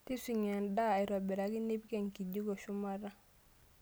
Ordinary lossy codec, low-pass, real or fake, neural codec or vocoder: none; none; real; none